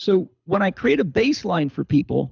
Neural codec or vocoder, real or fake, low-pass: codec, 24 kHz, 6 kbps, HILCodec; fake; 7.2 kHz